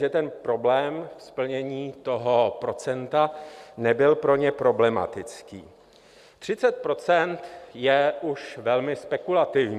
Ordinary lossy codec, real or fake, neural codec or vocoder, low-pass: Opus, 64 kbps; real; none; 14.4 kHz